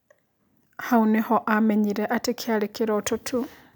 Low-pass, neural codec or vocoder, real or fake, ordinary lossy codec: none; none; real; none